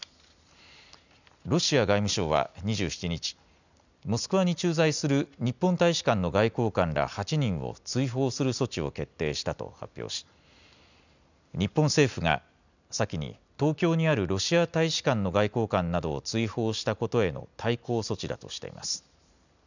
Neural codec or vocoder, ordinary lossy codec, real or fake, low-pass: none; none; real; 7.2 kHz